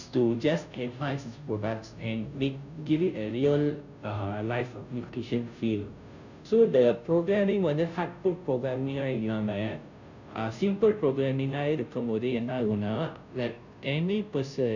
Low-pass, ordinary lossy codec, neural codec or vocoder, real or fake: 7.2 kHz; none; codec, 16 kHz, 0.5 kbps, FunCodec, trained on Chinese and English, 25 frames a second; fake